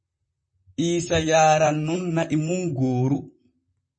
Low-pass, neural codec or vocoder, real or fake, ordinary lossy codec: 9.9 kHz; vocoder, 44.1 kHz, 128 mel bands, Pupu-Vocoder; fake; MP3, 32 kbps